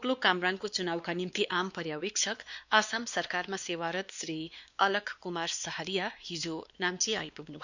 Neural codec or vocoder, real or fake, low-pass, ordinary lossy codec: codec, 16 kHz, 2 kbps, X-Codec, WavLM features, trained on Multilingual LibriSpeech; fake; 7.2 kHz; none